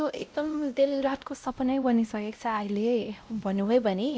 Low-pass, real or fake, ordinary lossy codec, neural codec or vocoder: none; fake; none; codec, 16 kHz, 0.5 kbps, X-Codec, WavLM features, trained on Multilingual LibriSpeech